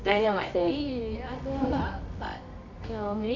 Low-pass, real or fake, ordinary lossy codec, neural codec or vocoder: 7.2 kHz; fake; none; codec, 24 kHz, 0.9 kbps, WavTokenizer, medium music audio release